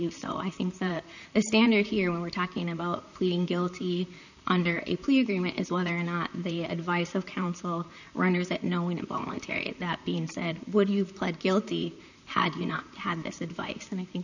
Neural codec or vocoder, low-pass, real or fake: vocoder, 44.1 kHz, 128 mel bands, Pupu-Vocoder; 7.2 kHz; fake